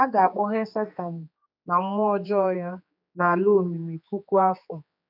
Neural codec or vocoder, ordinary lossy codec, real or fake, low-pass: codec, 16 kHz, 4 kbps, X-Codec, HuBERT features, trained on general audio; MP3, 48 kbps; fake; 5.4 kHz